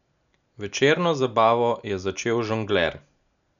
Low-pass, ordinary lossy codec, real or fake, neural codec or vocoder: 7.2 kHz; none; real; none